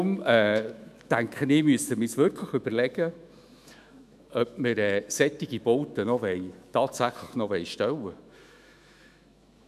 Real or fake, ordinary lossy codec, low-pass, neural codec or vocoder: fake; none; 14.4 kHz; autoencoder, 48 kHz, 128 numbers a frame, DAC-VAE, trained on Japanese speech